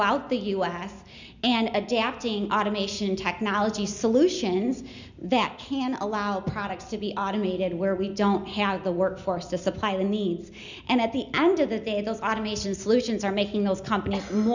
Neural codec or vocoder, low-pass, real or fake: none; 7.2 kHz; real